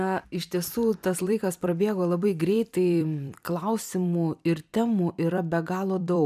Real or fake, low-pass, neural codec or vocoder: fake; 14.4 kHz; vocoder, 44.1 kHz, 128 mel bands every 256 samples, BigVGAN v2